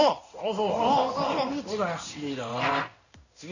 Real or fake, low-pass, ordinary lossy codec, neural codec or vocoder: fake; 7.2 kHz; none; codec, 16 kHz in and 24 kHz out, 1 kbps, XY-Tokenizer